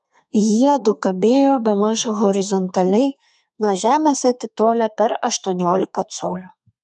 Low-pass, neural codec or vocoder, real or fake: 10.8 kHz; codec, 32 kHz, 1.9 kbps, SNAC; fake